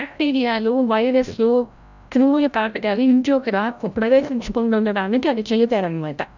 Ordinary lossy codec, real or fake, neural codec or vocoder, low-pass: none; fake; codec, 16 kHz, 0.5 kbps, FreqCodec, larger model; 7.2 kHz